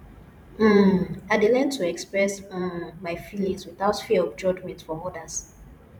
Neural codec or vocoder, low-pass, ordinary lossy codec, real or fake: vocoder, 44.1 kHz, 128 mel bands every 512 samples, BigVGAN v2; 19.8 kHz; none; fake